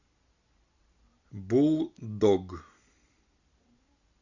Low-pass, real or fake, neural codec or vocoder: 7.2 kHz; real; none